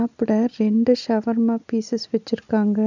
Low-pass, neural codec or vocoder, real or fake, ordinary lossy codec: 7.2 kHz; none; real; none